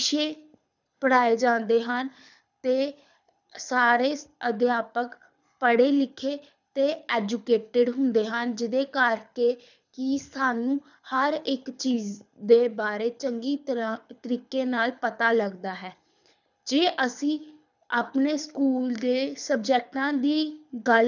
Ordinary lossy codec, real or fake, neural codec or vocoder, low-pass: none; fake; codec, 24 kHz, 6 kbps, HILCodec; 7.2 kHz